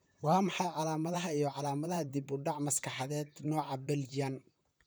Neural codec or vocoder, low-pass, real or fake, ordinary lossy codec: vocoder, 44.1 kHz, 128 mel bands, Pupu-Vocoder; none; fake; none